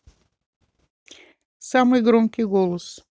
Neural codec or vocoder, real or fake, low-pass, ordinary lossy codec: none; real; none; none